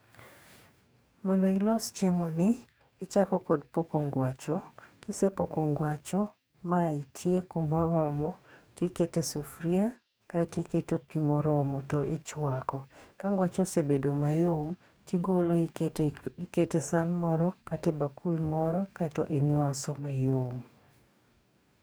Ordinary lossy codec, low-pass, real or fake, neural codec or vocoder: none; none; fake; codec, 44.1 kHz, 2.6 kbps, DAC